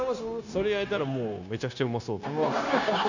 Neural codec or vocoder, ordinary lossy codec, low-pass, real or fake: codec, 16 kHz, 0.9 kbps, LongCat-Audio-Codec; none; 7.2 kHz; fake